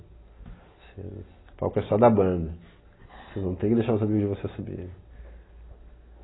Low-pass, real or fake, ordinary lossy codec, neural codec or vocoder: 7.2 kHz; real; AAC, 16 kbps; none